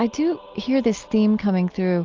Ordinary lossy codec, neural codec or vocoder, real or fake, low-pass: Opus, 32 kbps; none; real; 7.2 kHz